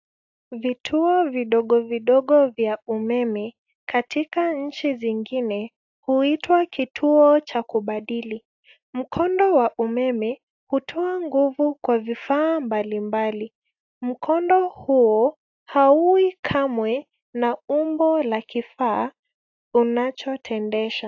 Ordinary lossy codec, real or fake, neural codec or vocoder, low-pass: AAC, 48 kbps; real; none; 7.2 kHz